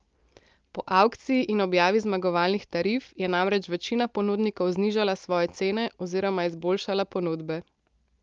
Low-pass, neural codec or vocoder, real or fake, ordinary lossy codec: 7.2 kHz; none; real; Opus, 32 kbps